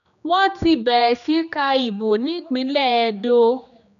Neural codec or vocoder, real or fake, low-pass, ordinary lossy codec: codec, 16 kHz, 2 kbps, X-Codec, HuBERT features, trained on general audio; fake; 7.2 kHz; none